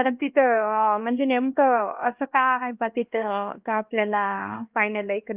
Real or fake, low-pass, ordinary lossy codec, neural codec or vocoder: fake; 3.6 kHz; Opus, 24 kbps; codec, 16 kHz, 1 kbps, X-Codec, HuBERT features, trained on LibriSpeech